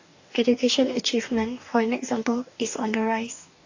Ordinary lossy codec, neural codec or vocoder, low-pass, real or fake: AAC, 48 kbps; codec, 44.1 kHz, 2.6 kbps, DAC; 7.2 kHz; fake